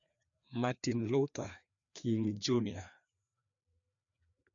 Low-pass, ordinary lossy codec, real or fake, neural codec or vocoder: 7.2 kHz; none; fake; codec, 16 kHz, 2 kbps, FreqCodec, larger model